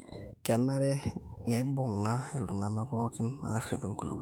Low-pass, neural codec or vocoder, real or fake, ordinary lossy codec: 19.8 kHz; autoencoder, 48 kHz, 32 numbers a frame, DAC-VAE, trained on Japanese speech; fake; MP3, 96 kbps